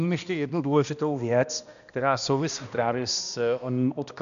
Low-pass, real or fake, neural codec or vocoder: 7.2 kHz; fake; codec, 16 kHz, 1 kbps, X-Codec, HuBERT features, trained on balanced general audio